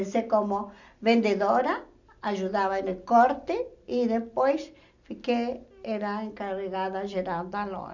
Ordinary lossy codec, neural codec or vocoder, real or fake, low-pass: none; none; real; 7.2 kHz